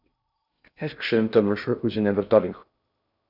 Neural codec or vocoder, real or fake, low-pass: codec, 16 kHz in and 24 kHz out, 0.6 kbps, FocalCodec, streaming, 2048 codes; fake; 5.4 kHz